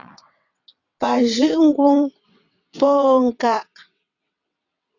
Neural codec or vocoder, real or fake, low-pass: vocoder, 22.05 kHz, 80 mel bands, WaveNeXt; fake; 7.2 kHz